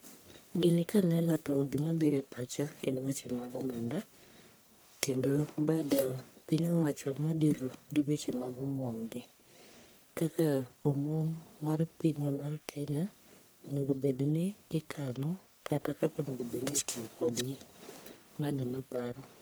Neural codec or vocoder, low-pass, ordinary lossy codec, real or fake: codec, 44.1 kHz, 1.7 kbps, Pupu-Codec; none; none; fake